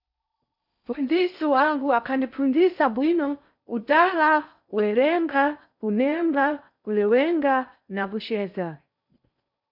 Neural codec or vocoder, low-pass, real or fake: codec, 16 kHz in and 24 kHz out, 0.6 kbps, FocalCodec, streaming, 4096 codes; 5.4 kHz; fake